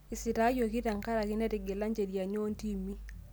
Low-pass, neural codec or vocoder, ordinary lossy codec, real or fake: none; none; none; real